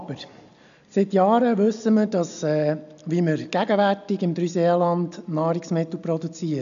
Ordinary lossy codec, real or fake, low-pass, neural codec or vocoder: none; real; 7.2 kHz; none